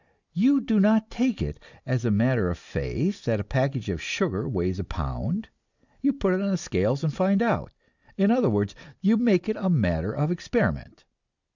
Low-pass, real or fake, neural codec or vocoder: 7.2 kHz; real; none